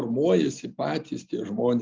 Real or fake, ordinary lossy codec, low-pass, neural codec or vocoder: real; Opus, 32 kbps; 7.2 kHz; none